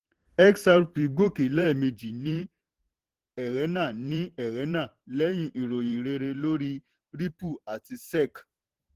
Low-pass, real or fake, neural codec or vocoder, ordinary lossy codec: 14.4 kHz; fake; vocoder, 44.1 kHz, 128 mel bands every 512 samples, BigVGAN v2; Opus, 16 kbps